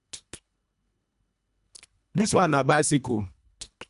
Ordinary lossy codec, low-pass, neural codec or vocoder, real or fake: none; 10.8 kHz; codec, 24 kHz, 1.5 kbps, HILCodec; fake